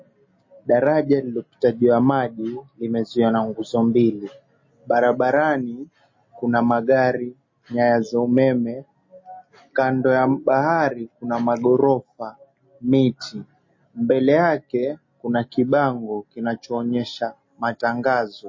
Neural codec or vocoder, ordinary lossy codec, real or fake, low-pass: none; MP3, 32 kbps; real; 7.2 kHz